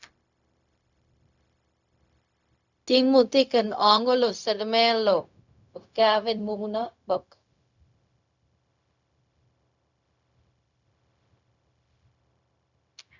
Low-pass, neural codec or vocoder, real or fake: 7.2 kHz; codec, 16 kHz, 0.4 kbps, LongCat-Audio-Codec; fake